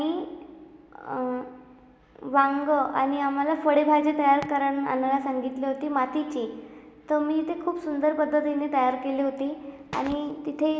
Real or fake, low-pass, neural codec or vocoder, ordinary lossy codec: real; none; none; none